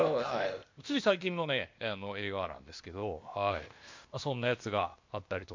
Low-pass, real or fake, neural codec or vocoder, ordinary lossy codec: 7.2 kHz; fake; codec, 16 kHz, 0.8 kbps, ZipCodec; MP3, 48 kbps